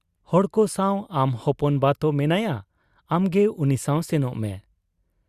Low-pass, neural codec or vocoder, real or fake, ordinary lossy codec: 14.4 kHz; none; real; Opus, 64 kbps